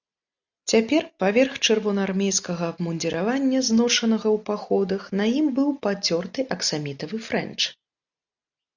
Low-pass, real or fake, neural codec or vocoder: 7.2 kHz; real; none